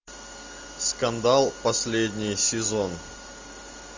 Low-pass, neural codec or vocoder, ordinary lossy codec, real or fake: 7.2 kHz; none; MP3, 64 kbps; real